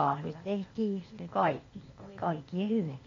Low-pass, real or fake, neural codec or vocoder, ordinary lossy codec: 7.2 kHz; fake; codec, 16 kHz, 0.8 kbps, ZipCodec; AAC, 32 kbps